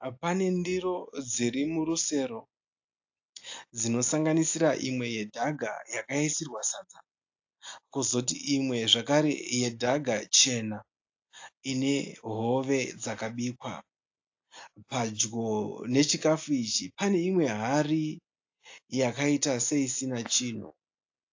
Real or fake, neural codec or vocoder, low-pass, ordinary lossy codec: real; none; 7.2 kHz; AAC, 48 kbps